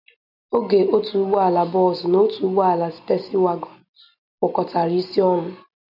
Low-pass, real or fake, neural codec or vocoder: 5.4 kHz; real; none